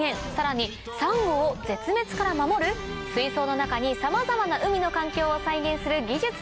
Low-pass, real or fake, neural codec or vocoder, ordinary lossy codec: none; real; none; none